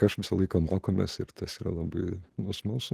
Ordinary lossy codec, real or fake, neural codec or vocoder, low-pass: Opus, 16 kbps; real; none; 14.4 kHz